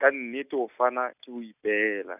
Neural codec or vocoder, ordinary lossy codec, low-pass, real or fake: none; none; 3.6 kHz; real